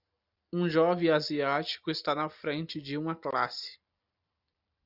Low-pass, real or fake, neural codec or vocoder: 5.4 kHz; real; none